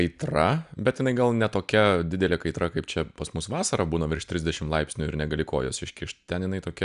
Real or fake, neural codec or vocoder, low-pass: real; none; 10.8 kHz